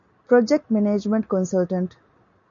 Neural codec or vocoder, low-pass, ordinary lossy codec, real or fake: none; 7.2 kHz; MP3, 96 kbps; real